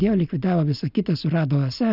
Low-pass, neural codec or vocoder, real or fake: 5.4 kHz; none; real